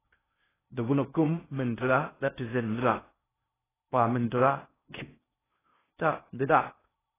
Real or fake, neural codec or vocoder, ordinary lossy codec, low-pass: fake; codec, 16 kHz in and 24 kHz out, 0.6 kbps, FocalCodec, streaming, 4096 codes; AAC, 16 kbps; 3.6 kHz